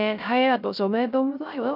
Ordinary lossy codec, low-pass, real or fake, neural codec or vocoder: none; 5.4 kHz; fake; codec, 16 kHz, 0.3 kbps, FocalCodec